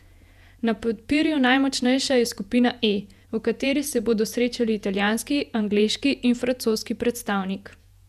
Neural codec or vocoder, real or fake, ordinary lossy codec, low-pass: vocoder, 48 kHz, 128 mel bands, Vocos; fake; none; 14.4 kHz